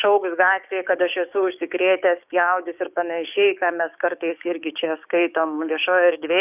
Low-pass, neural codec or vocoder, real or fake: 3.6 kHz; codec, 16 kHz, 6 kbps, DAC; fake